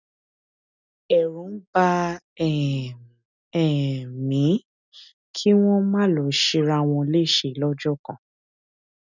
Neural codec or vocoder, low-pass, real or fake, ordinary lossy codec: none; 7.2 kHz; real; none